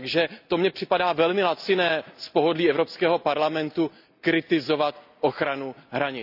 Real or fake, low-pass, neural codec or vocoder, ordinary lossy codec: real; 5.4 kHz; none; none